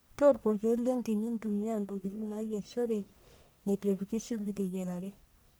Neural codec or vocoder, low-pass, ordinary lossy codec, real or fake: codec, 44.1 kHz, 1.7 kbps, Pupu-Codec; none; none; fake